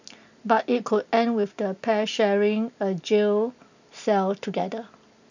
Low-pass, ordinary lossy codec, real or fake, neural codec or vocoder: 7.2 kHz; none; real; none